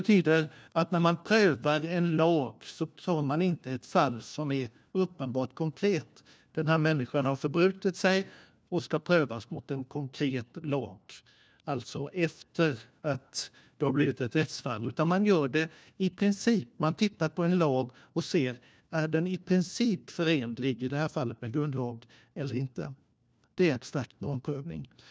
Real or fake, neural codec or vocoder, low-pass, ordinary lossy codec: fake; codec, 16 kHz, 1 kbps, FunCodec, trained on LibriTTS, 50 frames a second; none; none